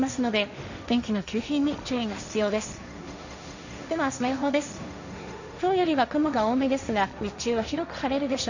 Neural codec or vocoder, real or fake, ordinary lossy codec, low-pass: codec, 16 kHz, 1.1 kbps, Voila-Tokenizer; fake; none; 7.2 kHz